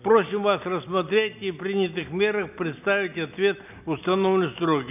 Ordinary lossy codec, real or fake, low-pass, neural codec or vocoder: none; real; 3.6 kHz; none